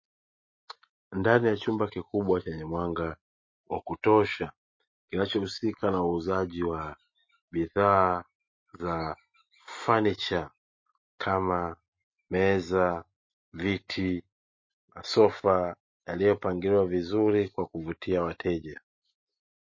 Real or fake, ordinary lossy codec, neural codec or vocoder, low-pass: real; MP3, 32 kbps; none; 7.2 kHz